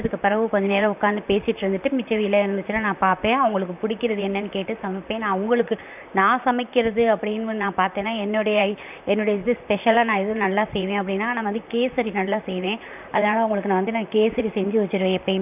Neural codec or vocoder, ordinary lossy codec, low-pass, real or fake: vocoder, 44.1 kHz, 128 mel bands, Pupu-Vocoder; none; 3.6 kHz; fake